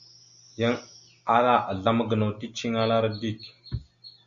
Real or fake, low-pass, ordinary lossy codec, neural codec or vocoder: real; 7.2 kHz; Opus, 64 kbps; none